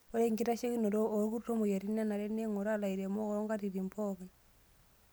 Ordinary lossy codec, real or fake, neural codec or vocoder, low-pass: none; real; none; none